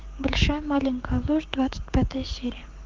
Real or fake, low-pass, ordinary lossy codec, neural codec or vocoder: fake; 7.2 kHz; Opus, 16 kbps; codec, 16 kHz, 6 kbps, DAC